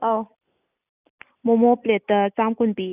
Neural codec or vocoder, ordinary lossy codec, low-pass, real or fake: none; none; 3.6 kHz; real